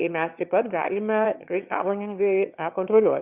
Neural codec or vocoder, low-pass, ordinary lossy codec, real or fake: autoencoder, 22.05 kHz, a latent of 192 numbers a frame, VITS, trained on one speaker; 3.6 kHz; Opus, 64 kbps; fake